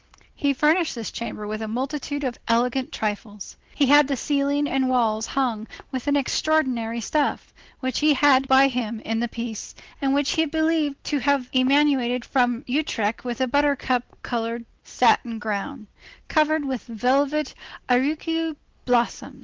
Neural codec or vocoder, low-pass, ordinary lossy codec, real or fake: none; 7.2 kHz; Opus, 16 kbps; real